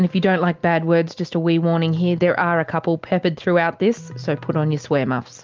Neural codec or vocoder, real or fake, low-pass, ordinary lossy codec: none; real; 7.2 kHz; Opus, 32 kbps